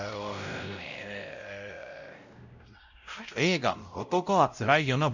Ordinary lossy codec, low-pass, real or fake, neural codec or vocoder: none; 7.2 kHz; fake; codec, 16 kHz, 0.5 kbps, X-Codec, WavLM features, trained on Multilingual LibriSpeech